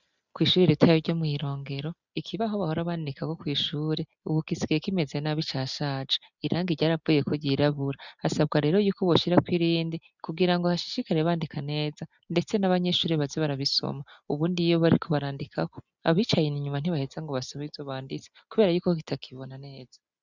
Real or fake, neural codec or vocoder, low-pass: real; none; 7.2 kHz